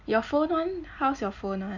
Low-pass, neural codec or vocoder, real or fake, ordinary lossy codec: 7.2 kHz; none; real; none